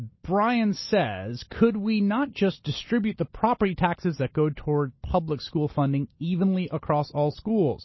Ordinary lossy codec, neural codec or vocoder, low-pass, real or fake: MP3, 24 kbps; none; 7.2 kHz; real